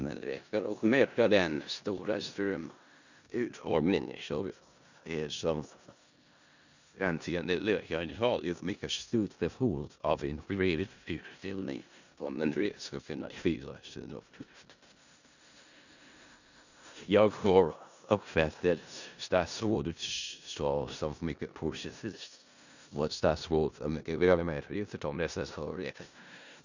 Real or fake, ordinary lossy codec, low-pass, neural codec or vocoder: fake; none; 7.2 kHz; codec, 16 kHz in and 24 kHz out, 0.4 kbps, LongCat-Audio-Codec, four codebook decoder